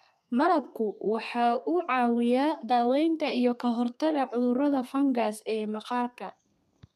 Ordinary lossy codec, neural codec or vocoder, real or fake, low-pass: MP3, 96 kbps; codec, 32 kHz, 1.9 kbps, SNAC; fake; 14.4 kHz